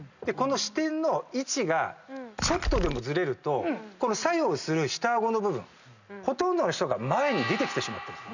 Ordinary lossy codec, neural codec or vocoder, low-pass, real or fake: none; none; 7.2 kHz; real